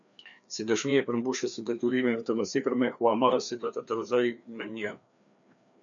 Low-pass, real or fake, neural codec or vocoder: 7.2 kHz; fake; codec, 16 kHz, 2 kbps, FreqCodec, larger model